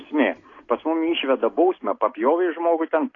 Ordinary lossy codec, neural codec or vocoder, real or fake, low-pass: AAC, 32 kbps; none; real; 7.2 kHz